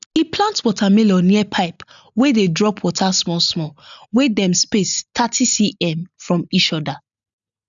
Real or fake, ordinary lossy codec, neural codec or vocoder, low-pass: real; none; none; 7.2 kHz